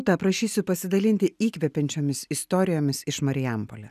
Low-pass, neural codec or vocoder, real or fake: 14.4 kHz; none; real